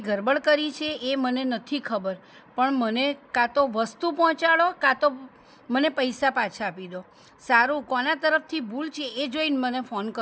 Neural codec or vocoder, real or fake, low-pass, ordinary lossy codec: none; real; none; none